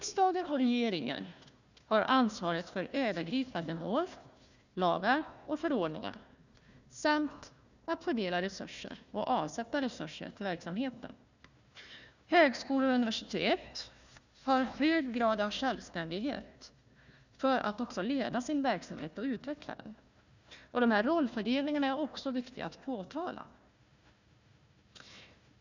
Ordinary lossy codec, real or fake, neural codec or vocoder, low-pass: none; fake; codec, 16 kHz, 1 kbps, FunCodec, trained on Chinese and English, 50 frames a second; 7.2 kHz